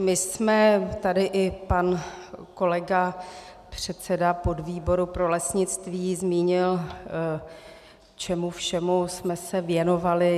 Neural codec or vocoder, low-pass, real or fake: none; 14.4 kHz; real